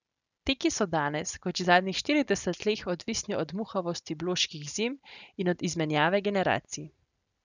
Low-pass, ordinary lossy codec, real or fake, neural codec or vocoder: 7.2 kHz; none; real; none